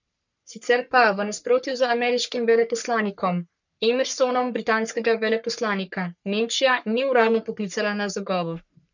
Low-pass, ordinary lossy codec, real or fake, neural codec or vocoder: 7.2 kHz; none; fake; codec, 44.1 kHz, 3.4 kbps, Pupu-Codec